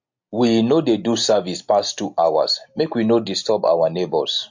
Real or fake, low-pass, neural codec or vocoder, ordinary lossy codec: real; 7.2 kHz; none; MP3, 48 kbps